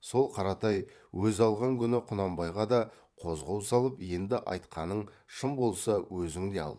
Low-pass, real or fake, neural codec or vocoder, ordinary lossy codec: none; fake; vocoder, 22.05 kHz, 80 mel bands, Vocos; none